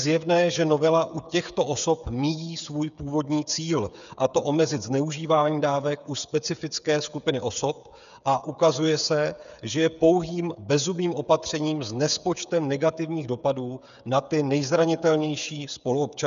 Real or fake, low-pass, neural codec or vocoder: fake; 7.2 kHz; codec, 16 kHz, 8 kbps, FreqCodec, smaller model